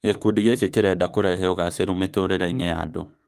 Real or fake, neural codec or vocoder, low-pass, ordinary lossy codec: fake; autoencoder, 48 kHz, 32 numbers a frame, DAC-VAE, trained on Japanese speech; 14.4 kHz; Opus, 32 kbps